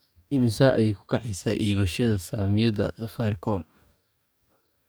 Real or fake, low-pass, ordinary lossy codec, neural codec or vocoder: fake; none; none; codec, 44.1 kHz, 2.6 kbps, DAC